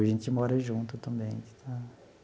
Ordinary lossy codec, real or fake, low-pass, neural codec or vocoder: none; real; none; none